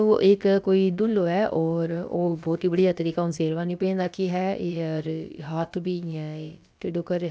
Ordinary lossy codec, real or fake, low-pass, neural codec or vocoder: none; fake; none; codec, 16 kHz, about 1 kbps, DyCAST, with the encoder's durations